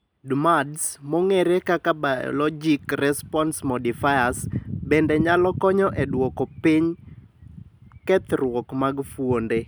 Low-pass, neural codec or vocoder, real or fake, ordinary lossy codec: none; none; real; none